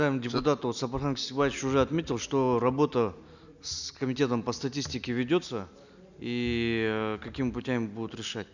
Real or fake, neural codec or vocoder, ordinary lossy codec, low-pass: real; none; none; 7.2 kHz